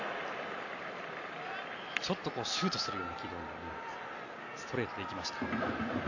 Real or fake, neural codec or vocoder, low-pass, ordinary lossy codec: real; none; 7.2 kHz; none